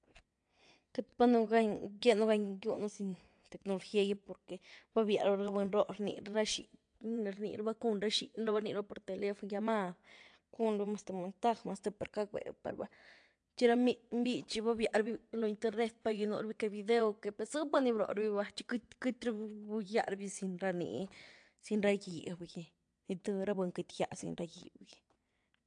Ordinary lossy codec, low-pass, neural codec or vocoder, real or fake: AAC, 64 kbps; 9.9 kHz; vocoder, 22.05 kHz, 80 mel bands, WaveNeXt; fake